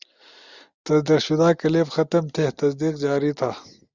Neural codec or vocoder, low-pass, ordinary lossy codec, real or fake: none; 7.2 kHz; Opus, 64 kbps; real